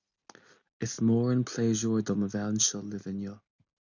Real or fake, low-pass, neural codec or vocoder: real; 7.2 kHz; none